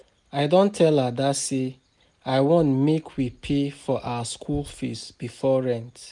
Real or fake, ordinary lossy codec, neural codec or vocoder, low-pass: real; none; none; 10.8 kHz